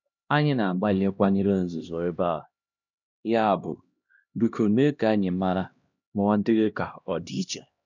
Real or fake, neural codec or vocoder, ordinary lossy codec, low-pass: fake; codec, 16 kHz, 1 kbps, X-Codec, HuBERT features, trained on LibriSpeech; none; 7.2 kHz